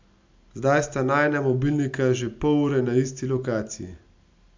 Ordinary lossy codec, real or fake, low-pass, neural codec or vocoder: MP3, 64 kbps; real; 7.2 kHz; none